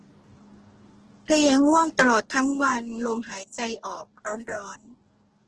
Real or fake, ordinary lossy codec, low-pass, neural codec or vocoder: fake; Opus, 16 kbps; 10.8 kHz; codec, 44.1 kHz, 2.6 kbps, DAC